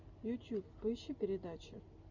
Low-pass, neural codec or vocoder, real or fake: 7.2 kHz; none; real